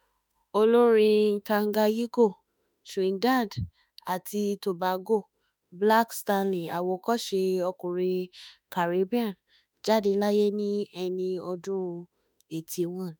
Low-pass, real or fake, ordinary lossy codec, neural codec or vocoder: none; fake; none; autoencoder, 48 kHz, 32 numbers a frame, DAC-VAE, trained on Japanese speech